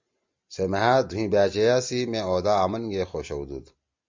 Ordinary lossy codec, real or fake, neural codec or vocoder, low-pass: MP3, 64 kbps; real; none; 7.2 kHz